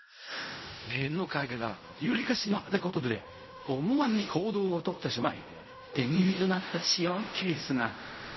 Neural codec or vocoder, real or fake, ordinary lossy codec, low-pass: codec, 16 kHz in and 24 kHz out, 0.4 kbps, LongCat-Audio-Codec, fine tuned four codebook decoder; fake; MP3, 24 kbps; 7.2 kHz